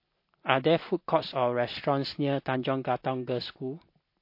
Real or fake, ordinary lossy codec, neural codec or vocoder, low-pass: real; MP3, 32 kbps; none; 5.4 kHz